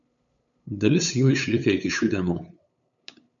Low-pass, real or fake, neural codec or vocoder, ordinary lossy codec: 7.2 kHz; fake; codec, 16 kHz, 8 kbps, FunCodec, trained on LibriTTS, 25 frames a second; MP3, 96 kbps